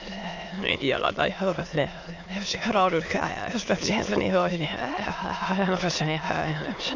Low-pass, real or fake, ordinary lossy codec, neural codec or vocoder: 7.2 kHz; fake; AAC, 48 kbps; autoencoder, 22.05 kHz, a latent of 192 numbers a frame, VITS, trained on many speakers